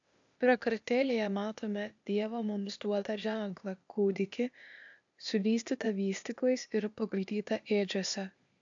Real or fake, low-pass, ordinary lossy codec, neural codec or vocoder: fake; 7.2 kHz; AAC, 64 kbps; codec, 16 kHz, 0.8 kbps, ZipCodec